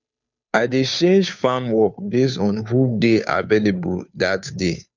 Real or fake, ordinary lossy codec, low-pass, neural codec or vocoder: fake; none; 7.2 kHz; codec, 16 kHz, 2 kbps, FunCodec, trained on Chinese and English, 25 frames a second